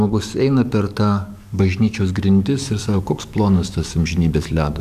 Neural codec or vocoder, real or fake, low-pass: codec, 44.1 kHz, 7.8 kbps, DAC; fake; 14.4 kHz